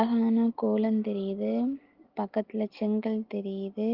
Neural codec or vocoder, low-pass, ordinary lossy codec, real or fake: none; 5.4 kHz; Opus, 16 kbps; real